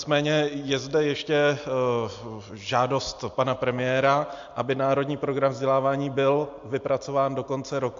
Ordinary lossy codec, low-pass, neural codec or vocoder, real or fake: AAC, 64 kbps; 7.2 kHz; none; real